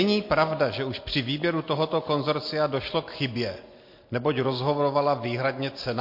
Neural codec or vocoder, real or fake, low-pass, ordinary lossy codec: none; real; 5.4 kHz; MP3, 32 kbps